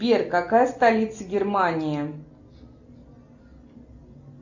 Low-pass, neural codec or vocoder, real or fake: 7.2 kHz; none; real